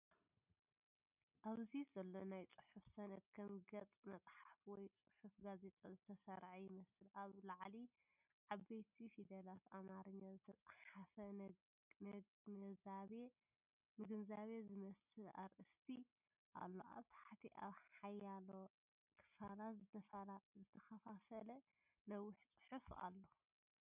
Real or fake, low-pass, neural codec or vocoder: real; 3.6 kHz; none